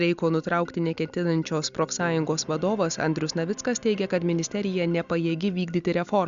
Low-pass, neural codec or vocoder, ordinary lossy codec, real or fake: 7.2 kHz; none; Opus, 64 kbps; real